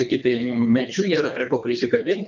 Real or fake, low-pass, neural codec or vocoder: fake; 7.2 kHz; codec, 24 kHz, 1.5 kbps, HILCodec